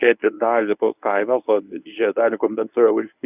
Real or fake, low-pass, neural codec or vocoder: fake; 3.6 kHz; codec, 24 kHz, 0.9 kbps, WavTokenizer, medium speech release version 1